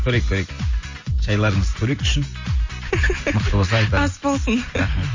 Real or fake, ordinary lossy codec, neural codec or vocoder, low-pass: real; MP3, 32 kbps; none; 7.2 kHz